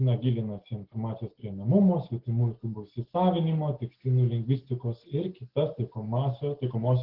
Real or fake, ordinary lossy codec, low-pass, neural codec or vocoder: real; Opus, 16 kbps; 5.4 kHz; none